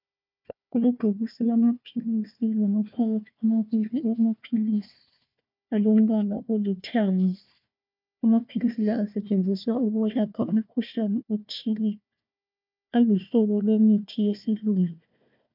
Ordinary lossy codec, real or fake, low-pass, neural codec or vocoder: MP3, 48 kbps; fake; 5.4 kHz; codec, 16 kHz, 1 kbps, FunCodec, trained on Chinese and English, 50 frames a second